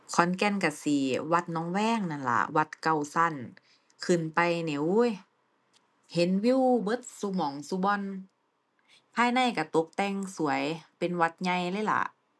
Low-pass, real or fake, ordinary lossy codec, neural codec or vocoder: none; real; none; none